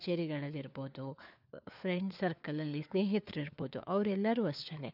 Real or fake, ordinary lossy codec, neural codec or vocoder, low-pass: fake; none; codec, 16 kHz, 4 kbps, FunCodec, trained on LibriTTS, 50 frames a second; 5.4 kHz